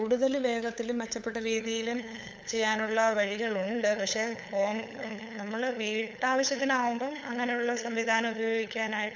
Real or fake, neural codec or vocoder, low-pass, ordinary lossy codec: fake; codec, 16 kHz, 8 kbps, FunCodec, trained on LibriTTS, 25 frames a second; none; none